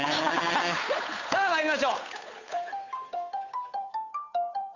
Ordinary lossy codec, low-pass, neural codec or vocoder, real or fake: none; 7.2 kHz; codec, 16 kHz, 8 kbps, FunCodec, trained on Chinese and English, 25 frames a second; fake